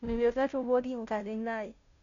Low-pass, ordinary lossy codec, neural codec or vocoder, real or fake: 7.2 kHz; MP3, 64 kbps; codec, 16 kHz, 0.5 kbps, FunCodec, trained on Chinese and English, 25 frames a second; fake